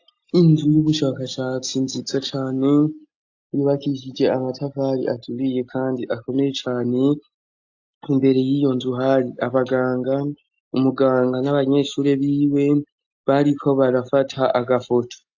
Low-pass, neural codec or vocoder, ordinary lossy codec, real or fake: 7.2 kHz; none; AAC, 48 kbps; real